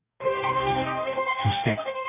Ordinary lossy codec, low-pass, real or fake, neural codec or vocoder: none; 3.6 kHz; fake; codec, 44.1 kHz, 2.6 kbps, DAC